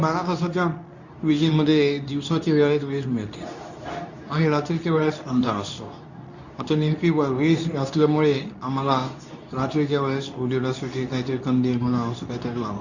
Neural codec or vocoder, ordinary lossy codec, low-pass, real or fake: codec, 24 kHz, 0.9 kbps, WavTokenizer, medium speech release version 2; none; 7.2 kHz; fake